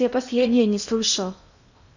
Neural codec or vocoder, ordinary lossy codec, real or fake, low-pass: codec, 16 kHz in and 24 kHz out, 0.8 kbps, FocalCodec, streaming, 65536 codes; none; fake; 7.2 kHz